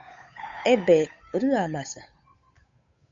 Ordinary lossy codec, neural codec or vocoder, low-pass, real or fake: MP3, 48 kbps; codec, 16 kHz, 8 kbps, FunCodec, trained on Chinese and English, 25 frames a second; 7.2 kHz; fake